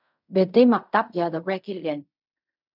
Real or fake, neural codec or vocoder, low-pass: fake; codec, 16 kHz in and 24 kHz out, 0.4 kbps, LongCat-Audio-Codec, fine tuned four codebook decoder; 5.4 kHz